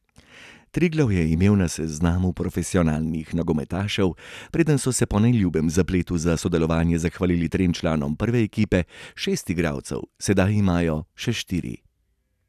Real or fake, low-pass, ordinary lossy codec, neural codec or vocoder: real; 14.4 kHz; none; none